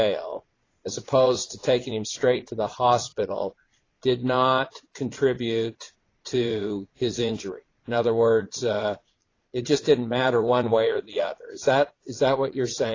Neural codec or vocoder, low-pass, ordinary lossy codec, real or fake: vocoder, 22.05 kHz, 80 mel bands, Vocos; 7.2 kHz; AAC, 32 kbps; fake